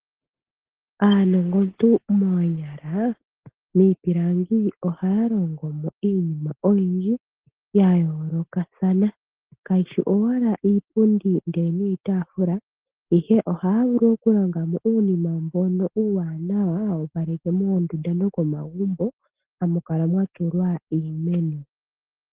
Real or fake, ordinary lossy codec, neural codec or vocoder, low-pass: real; Opus, 16 kbps; none; 3.6 kHz